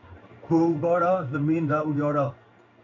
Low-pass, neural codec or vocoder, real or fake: 7.2 kHz; codec, 16 kHz in and 24 kHz out, 1 kbps, XY-Tokenizer; fake